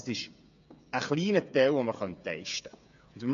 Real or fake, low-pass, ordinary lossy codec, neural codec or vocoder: fake; 7.2 kHz; MP3, 48 kbps; codec, 16 kHz, 8 kbps, FreqCodec, smaller model